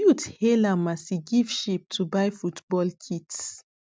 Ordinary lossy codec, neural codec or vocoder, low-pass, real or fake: none; none; none; real